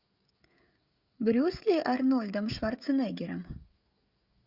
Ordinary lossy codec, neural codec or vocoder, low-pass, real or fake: Opus, 64 kbps; codec, 16 kHz, 16 kbps, FreqCodec, smaller model; 5.4 kHz; fake